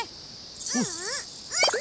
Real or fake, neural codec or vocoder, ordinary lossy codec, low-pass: real; none; none; none